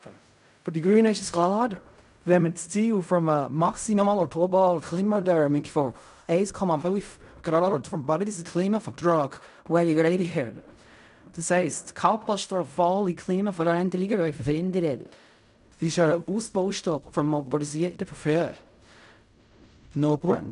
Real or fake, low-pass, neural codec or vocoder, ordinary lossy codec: fake; 10.8 kHz; codec, 16 kHz in and 24 kHz out, 0.4 kbps, LongCat-Audio-Codec, fine tuned four codebook decoder; none